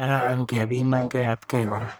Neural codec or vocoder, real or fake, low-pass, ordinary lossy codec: codec, 44.1 kHz, 1.7 kbps, Pupu-Codec; fake; none; none